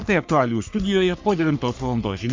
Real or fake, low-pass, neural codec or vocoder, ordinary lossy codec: fake; 7.2 kHz; codec, 44.1 kHz, 3.4 kbps, Pupu-Codec; MP3, 64 kbps